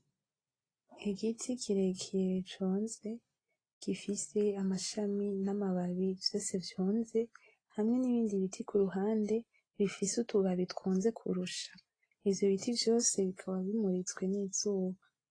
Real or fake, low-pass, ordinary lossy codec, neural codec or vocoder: real; 9.9 kHz; AAC, 32 kbps; none